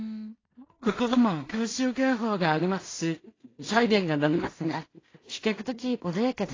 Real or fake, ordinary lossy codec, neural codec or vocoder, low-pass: fake; AAC, 32 kbps; codec, 16 kHz in and 24 kHz out, 0.4 kbps, LongCat-Audio-Codec, two codebook decoder; 7.2 kHz